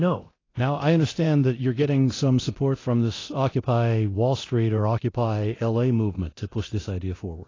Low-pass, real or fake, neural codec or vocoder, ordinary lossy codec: 7.2 kHz; fake; codec, 24 kHz, 0.9 kbps, DualCodec; AAC, 32 kbps